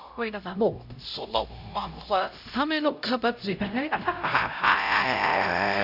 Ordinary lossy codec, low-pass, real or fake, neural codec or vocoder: none; 5.4 kHz; fake; codec, 16 kHz, 0.5 kbps, X-Codec, HuBERT features, trained on LibriSpeech